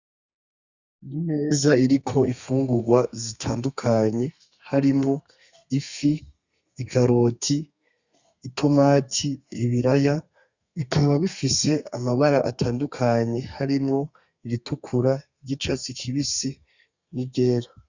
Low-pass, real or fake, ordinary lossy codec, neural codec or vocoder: 7.2 kHz; fake; Opus, 64 kbps; codec, 32 kHz, 1.9 kbps, SNAC